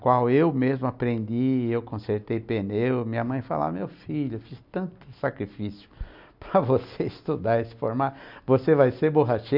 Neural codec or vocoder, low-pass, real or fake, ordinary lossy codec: none; 5.4 kHz; real; none